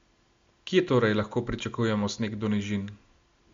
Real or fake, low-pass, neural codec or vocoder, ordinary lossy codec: real; 7.2 kHz; none; MP3, 48 kbps